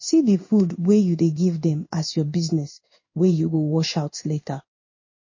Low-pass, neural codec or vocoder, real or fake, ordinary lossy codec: 7.2 kHz; codec, 16 kHz in and 24 kHz out, 1 kbps, XY-Tokenizer; fake; MP3, 32 kbps